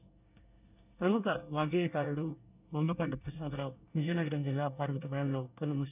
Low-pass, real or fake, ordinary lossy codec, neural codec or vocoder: 3.6 kHz; fake; none; codec, 24 kHz, 1 kbps, SNAC